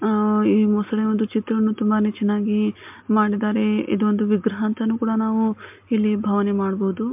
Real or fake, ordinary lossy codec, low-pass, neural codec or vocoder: real; MP3, 32 kbps; 3.6 kHz; none